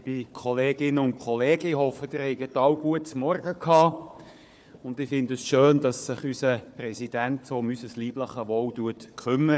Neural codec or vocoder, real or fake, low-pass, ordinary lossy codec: codec, 16 kHz, 4 kbps, FunCodec, trained on Chinese and English, 50 frames a second; fake; none; none